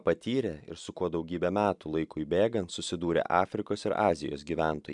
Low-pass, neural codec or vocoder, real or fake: 10.8 kHz; vocoder, 44.1 kHz, 128 mel bands every 256 samples, BigVGAN v2; fake